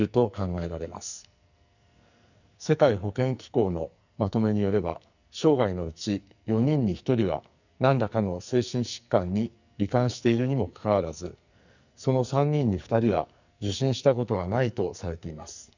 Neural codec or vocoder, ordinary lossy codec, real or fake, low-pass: codec, 44.1 kHz, 2.6 kbps, SNAC; none; fake; 7.2 kHz